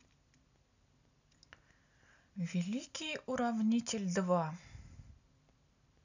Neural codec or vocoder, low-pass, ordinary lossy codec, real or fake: none; 7.2 kHz; none; real